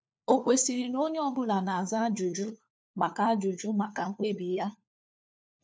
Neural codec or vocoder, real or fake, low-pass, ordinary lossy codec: codec, 16 kHz, 4 kbps, FunCodec, trained on LibriTTS, 50 frames a second; fake; none; none